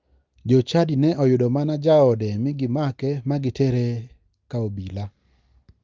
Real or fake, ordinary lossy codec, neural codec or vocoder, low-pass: real; Opus, 32 kbps; none; 7.2 kHz